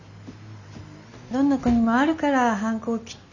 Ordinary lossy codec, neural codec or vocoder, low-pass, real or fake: none; none; 7.2 kHz; real